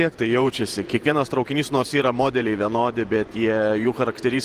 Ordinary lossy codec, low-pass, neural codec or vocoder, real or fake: Opus, 24 kbps; 14.4 kHz; vocoder, 48 kHz, 128 mel bands, Vocos; fake